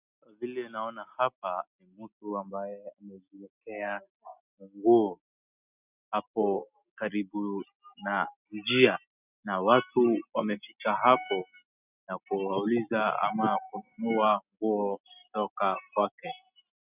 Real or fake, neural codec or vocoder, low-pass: real; none; 3.6 kHz